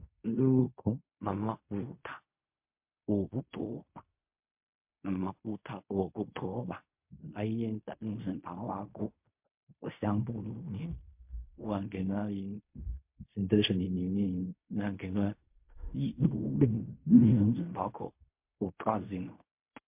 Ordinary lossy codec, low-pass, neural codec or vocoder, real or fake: MP3, 32 kbps; 3.6 kHz; codec, 16 kHz in and 24 kHz out, 0.4 kbps, LongCat-Audio-Codec, fine tuned four codebook decoder; fake